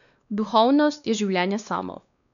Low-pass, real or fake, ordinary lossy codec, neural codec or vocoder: 7.2 kHz; fake; none; codec, 16 kHz, 2 kbps, X-Codec, WavLM features, trained on Multilingual LibriSpeech